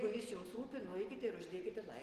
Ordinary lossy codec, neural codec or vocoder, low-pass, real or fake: Opus, 16 kbps; none; 14.4 kHz; real